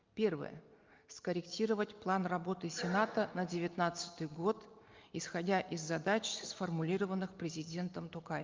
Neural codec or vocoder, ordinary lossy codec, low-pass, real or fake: none; Opus, 24 kbps; 7.2 kHz; real